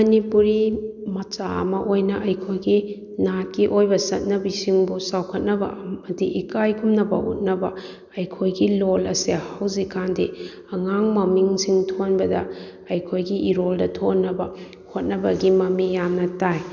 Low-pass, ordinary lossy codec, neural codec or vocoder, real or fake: 7.2 kHz; none; none; real